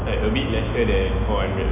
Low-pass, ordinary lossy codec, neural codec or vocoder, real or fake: 3.6 kHz; none; none; real